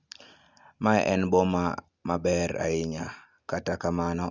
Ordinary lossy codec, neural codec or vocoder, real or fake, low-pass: Opus, 64 kbps; none; real; 7.2 kHz